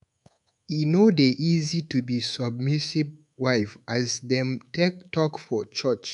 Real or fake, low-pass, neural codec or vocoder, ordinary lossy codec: fake; 10.8 kHz; codec, 24 kHz, 3.1 kbps, DualCodec; none